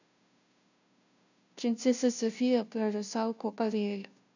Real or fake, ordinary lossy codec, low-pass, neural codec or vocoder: fake; none; 7.2 kHz; codec, 16 kHz, 0.5 kbps, FunCodec, trained on Chinese and English, 25 frames a second